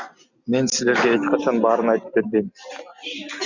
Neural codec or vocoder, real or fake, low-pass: none; real; 7.2 kHz